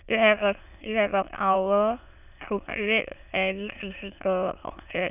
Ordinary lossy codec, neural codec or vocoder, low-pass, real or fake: none; autoencoder, 22.05 kHz, a latent of 192 numbers a frame, VITS, trained on many speakers; 3.6 kHz; fake